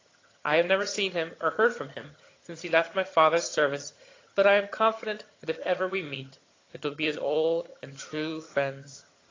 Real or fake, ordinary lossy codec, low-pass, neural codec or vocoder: fake; AAC, 32 kbps; 7.2 kHz; vocoder, 22.05 kHz, 80 mel bands, HiFi-GAN